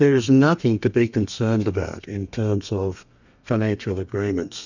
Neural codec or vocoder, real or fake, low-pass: codec, 32 kHz, 1.9 kbps, SNAC; fake; 7.2 kHz